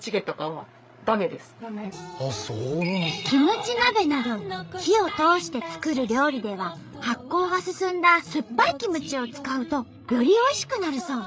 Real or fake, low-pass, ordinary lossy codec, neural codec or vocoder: fake; none; none; codec, 16 kHz, 8 kbps, FreqCodec, larger model